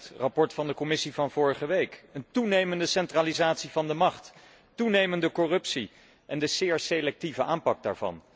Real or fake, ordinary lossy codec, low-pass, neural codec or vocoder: real; none; none; none